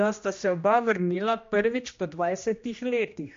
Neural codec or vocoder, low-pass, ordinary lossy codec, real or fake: codec, 16 kHz, 1 kbps, X-Codec, HuBERT features, trained on general audio; 7.2 kHz; MP3, 48 kbps; fake